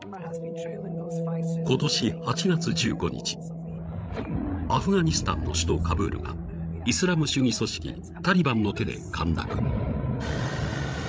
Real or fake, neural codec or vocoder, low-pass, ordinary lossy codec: fake; codec, 16 kHz, 16 kbps, FreqCodec, larger model; none; none